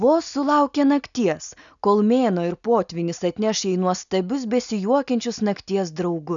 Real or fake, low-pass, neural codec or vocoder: real; 7.2 kHz; none